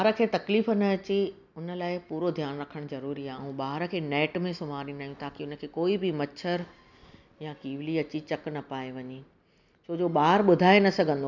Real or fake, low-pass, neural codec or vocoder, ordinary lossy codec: real; 7.2 kHz; none; none